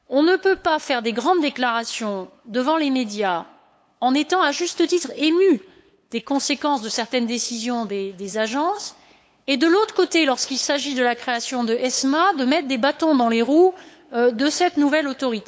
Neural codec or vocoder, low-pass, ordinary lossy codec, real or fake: codec, 16 kHz, 8 kbps, FunCodec, trained on LibriTTS, 25 frames a second; none; none; fake